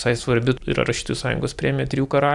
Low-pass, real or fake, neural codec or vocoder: 10.8 kHz; real; none